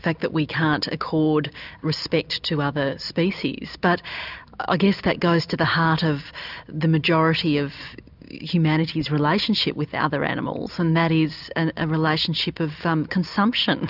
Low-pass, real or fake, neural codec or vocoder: 5.4 kHz; real; none